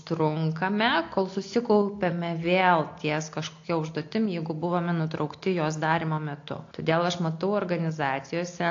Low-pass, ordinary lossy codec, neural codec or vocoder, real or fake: 7.2 kHz; AAC, 48 kbps; none; real